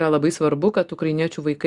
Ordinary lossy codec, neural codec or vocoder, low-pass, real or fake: Opus, 64 kbps; none; 10.8 kHz; real